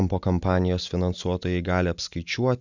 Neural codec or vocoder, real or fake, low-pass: none; real; 7.2 kHz